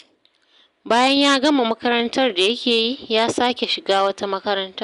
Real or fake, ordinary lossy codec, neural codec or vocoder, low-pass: real; Opus, 64 kbps; none; 10.8 kHz